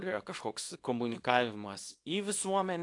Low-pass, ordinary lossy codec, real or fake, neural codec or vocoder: 10.8 kHz; AAC, 48 kbps; fake; codec, 24 kHz, 0.9 kbps, WavTokenizer, small release